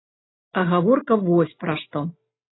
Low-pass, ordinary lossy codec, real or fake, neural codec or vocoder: 7.2 kHz; AAC, 16 kbps; real; none